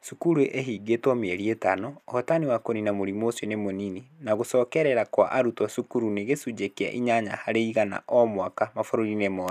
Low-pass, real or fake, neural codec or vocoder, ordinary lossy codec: 14.4 kHz; real; none; none